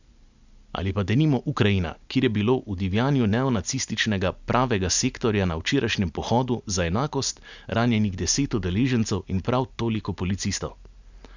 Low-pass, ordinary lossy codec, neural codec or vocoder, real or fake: 7.2 kHz; none; none; real